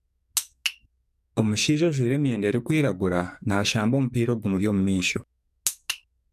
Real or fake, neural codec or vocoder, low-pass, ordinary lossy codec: fake; codec, 44.1 kHz, 2.6 kbps, SNAC; 14.4 kHz; none